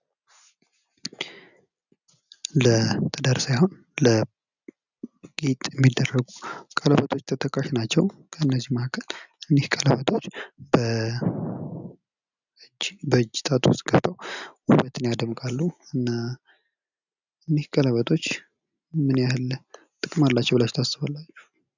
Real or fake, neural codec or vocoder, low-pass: real; none; 7.2 kHz